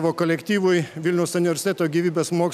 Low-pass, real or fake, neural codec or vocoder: 14.4 kHz; real; none